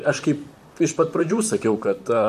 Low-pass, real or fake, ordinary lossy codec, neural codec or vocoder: 14.4 kHz; fake; MP3, 64 kbps; vocoder, 44.1 kHz, 128 mel bands, Pupu-Vocoder